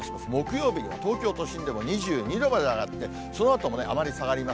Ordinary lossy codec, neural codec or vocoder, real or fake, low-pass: none; none; real; none